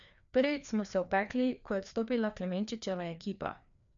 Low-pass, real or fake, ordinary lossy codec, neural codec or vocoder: 7.2 kHz; fake; none; codec, 16 kHz, 2 kbps, FreqCodec, larger model